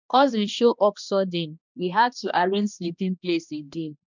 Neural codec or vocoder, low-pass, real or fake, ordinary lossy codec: codec, 16 kHz, 1 kbps, X-Codec, HuBERT features, trained on balanced general audio; 7.2 kHz; fake; none